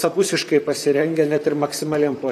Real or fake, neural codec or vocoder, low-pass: fake; vocoder, 44.1 kHz, 128 mel bands, Pupu-Vocoder; 14.4 kHz